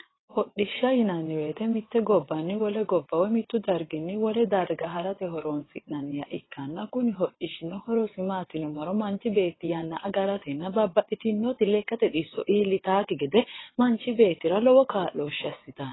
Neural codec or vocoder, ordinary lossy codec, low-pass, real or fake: vocoder, 22.05 kHz, 80 mel bands, WaveNeXt; AAC, 16 kbps; 7.2 kHz; fake